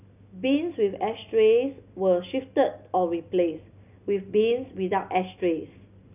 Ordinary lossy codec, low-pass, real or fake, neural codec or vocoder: none; 3.6 kHz; real; none